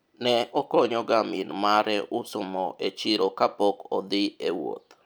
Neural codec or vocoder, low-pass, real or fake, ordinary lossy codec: none; none; real; none